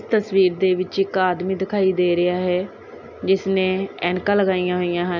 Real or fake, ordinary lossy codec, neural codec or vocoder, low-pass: real; none; none; 7.2 kHz